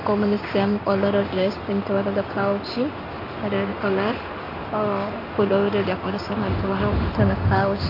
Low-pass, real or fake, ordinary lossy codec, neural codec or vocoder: 5.4 kHz; fake; MP3, 32 kbps; codec, 16 kHz in and 24 kHz out, 1 kbps, XY-Tokenizer